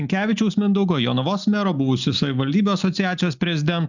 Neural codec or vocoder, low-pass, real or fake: none; 7.2 kHz; real